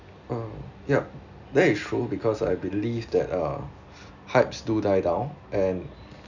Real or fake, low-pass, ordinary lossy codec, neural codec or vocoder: real; 7.2 kHz; none; none